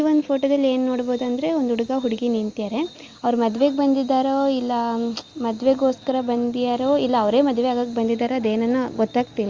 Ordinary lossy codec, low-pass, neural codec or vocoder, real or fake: Opus, 32 kbps; 7.2 kHz; none; real